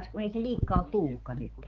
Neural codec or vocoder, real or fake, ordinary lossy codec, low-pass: codec, 16 kHz, 4 kbps, X-Codec, HuBERT features, trained on balanced general audio; fake; Opus, 16 kbps; 7.2 kHz